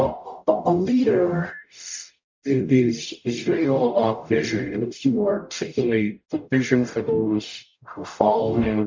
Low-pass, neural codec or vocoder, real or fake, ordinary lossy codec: 7.2 kHz; codec, 44.1 kHz, 0.9 kbps, DAC; fake; MP3, 48 kbps